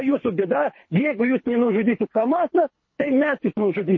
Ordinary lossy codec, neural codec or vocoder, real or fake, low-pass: MP3, 32 kbps; codec, 24 kHz, 3 kbps, HILCodec; fake; 7.2 kHz